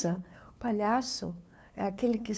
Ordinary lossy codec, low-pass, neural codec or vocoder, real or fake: none; none; codec, 16 kHz, 2 kbps, FunCodec, trained on LibriTTS, 25 frames a second; fake